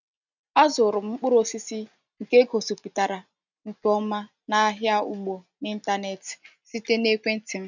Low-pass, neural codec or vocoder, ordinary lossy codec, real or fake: 7.2 kHz; none; none; real